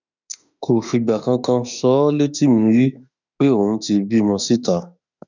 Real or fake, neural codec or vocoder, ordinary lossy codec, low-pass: fake; autoencoder, 48 kHz, 32 numbers a frame, DAC-VAE, trained on Japanese speech; none; 7.2 kHz